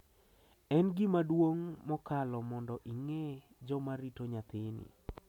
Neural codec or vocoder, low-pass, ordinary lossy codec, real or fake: none; 19.8 kHz; MP3, 96 kbps; real